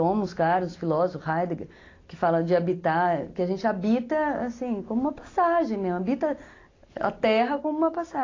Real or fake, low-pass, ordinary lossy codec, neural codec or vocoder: real; 7.2 kHz; AAC, 32 kbps; none